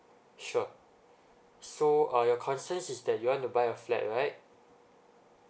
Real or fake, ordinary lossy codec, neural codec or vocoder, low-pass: real; none; none; none